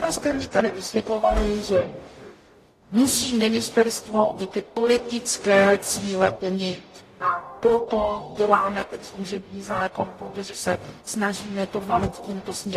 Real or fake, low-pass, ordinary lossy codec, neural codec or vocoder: fake; 14.4 kHz; AAC, 48 kbps; codec, 44.1 kHz, 0.9 kbps, DAC